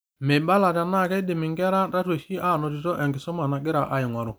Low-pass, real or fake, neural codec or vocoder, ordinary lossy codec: none; real; none; none